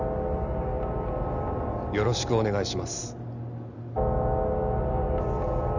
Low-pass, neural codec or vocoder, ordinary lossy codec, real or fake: 7.2 kHz; none; none; real